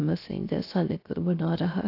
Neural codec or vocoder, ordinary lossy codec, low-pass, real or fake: codec, 16 kHz, 0.3 kbps, FocalCodec; AAC, 32 kbps; 5.4 kHz; fake